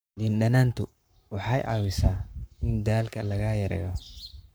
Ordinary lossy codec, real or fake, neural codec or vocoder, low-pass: none; fake; codec, 44.1 kHz, 7.8 kbps, Pupu-Codec; none